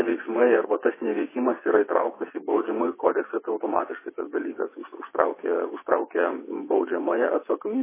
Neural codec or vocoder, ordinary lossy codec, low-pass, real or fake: vocoder, 22.05 kHz, 80 mel bands, WaveNeXt; MP3, 16 kbps; 3.6 kHz; fake